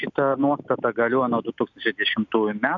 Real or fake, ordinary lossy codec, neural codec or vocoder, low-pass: real; AAC, 64 kbps; none; 7.2 kHz